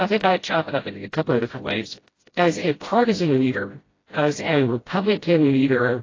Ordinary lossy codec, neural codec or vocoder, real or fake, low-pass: AAC, 32 kbps; codec, 16 kHz, 0.5 kbps, FreqCodec, smaller model; fake; 7.2 kHz